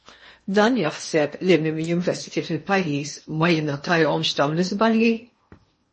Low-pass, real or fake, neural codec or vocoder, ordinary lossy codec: 10.8 kHz; fake; codec, 16 kHz in and 24 kHz out, 0.8 kbps, FocalCodec, streaming, 65536 codes; MP3, 32 kbps